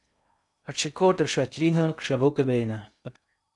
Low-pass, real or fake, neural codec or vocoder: 10.8 kHz; fake; codec, 16 kHz in and 24 kHz out, 0.6 kbps, FocalCodec, streaming, 2048 codes